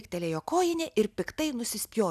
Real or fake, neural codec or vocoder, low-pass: real; none; 14.4 kHz